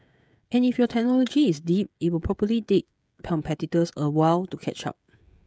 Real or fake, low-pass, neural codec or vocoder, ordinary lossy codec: fake; none; codec, 16 kHz, 16 kbps, FreqCodec, smaller model; none